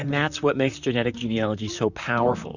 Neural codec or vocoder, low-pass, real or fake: vocoder, 44.1 kHz, 128 mel bands, Pupu-Vocoder; 7.2 kHz; fake